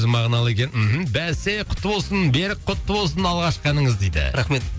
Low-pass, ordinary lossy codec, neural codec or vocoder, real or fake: none; none; none; real